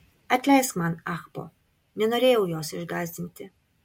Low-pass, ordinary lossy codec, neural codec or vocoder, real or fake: 19.8 kHz; MP3, 64 kbps; none; real